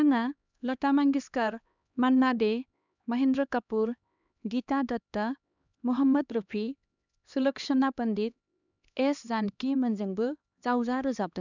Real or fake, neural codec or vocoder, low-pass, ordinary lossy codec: fake; codec, 16 kHz, 2 kbps, X-Codec, HuBERT features, trained on LibriSpeech; 7.2 kHz; none